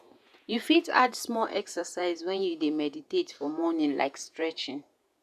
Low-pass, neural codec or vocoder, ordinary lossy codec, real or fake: 14.4 kHz; vocoder, 48 kHz, 128 mel bands, Vocos; none; fake